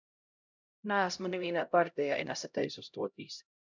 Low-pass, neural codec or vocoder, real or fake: 7.2 kHz; codec, 16 kHz, 0.5 kbps, X-Codec, HuBERT features, trained on LibriSpeech; fake